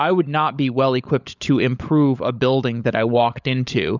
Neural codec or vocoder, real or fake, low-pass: codec, 16 kHz, 16 kbps, FunCodec, trained on LibriTTS, 50 frames a second; fake; 7.2 kHz